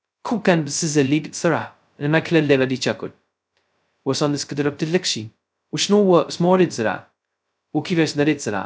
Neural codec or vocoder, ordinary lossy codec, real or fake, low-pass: codec, 16 kHz, 0.2 kbps, FocalCodec; none; fake; none